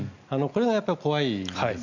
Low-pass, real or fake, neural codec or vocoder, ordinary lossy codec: 7.2 kHz; real; none; none